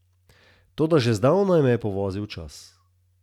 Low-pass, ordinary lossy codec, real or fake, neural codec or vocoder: 19.8 kHz; none; real; none